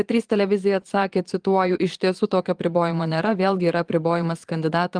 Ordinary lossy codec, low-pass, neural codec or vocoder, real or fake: Opus, 24 kbps; 9.9 kHz; vocoder, 22.05 kHz, 80 mel bands, Vocos; fake